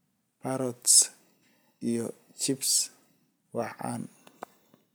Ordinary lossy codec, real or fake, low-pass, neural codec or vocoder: none; real; none; none